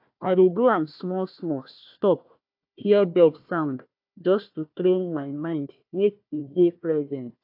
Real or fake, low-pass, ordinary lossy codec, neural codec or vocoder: fake; 5.4 kHz; AAC, 48 kbps; codec, 16 kHz, 1 kbps, FunCodec, trained on Chinese and English, 50 frames a second